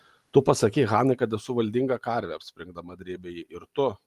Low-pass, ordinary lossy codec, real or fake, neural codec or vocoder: 14.4 kHz; Opus, 24 kbps; real; none